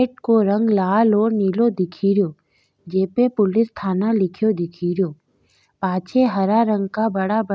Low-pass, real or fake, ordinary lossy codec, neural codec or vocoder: none; real; none; none